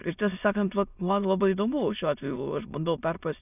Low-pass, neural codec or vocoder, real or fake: 3.6 kHz; autoencoder, 22.05 kHz, a latent of 192 numbers a frame, VITS, trained on many speakers; fake